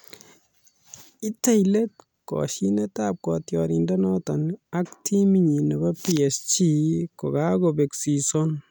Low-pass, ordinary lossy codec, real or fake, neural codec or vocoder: none; none; real; none